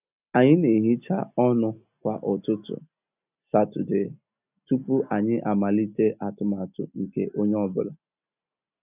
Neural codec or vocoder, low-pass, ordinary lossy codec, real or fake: none; 3.6 kHz; none; real